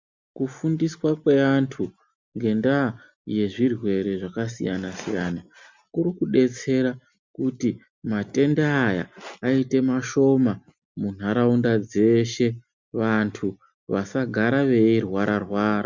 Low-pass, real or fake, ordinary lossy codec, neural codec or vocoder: 7.2 kHz; real; MP3, 64 kbps; none